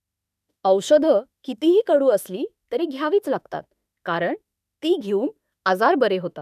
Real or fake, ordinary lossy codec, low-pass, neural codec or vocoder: fake; none; 14.4 kHz; autoencoder, 48 kHz, 32 numbers a frame, DAC-VAE, trained on Japanese speech